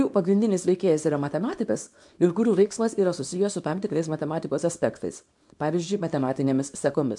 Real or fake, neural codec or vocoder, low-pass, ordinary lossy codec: fake; codec, 24 kHz, 0.9 kbps, WavTokenizer, small release; 10.8 kHz; MP3, 64 kbps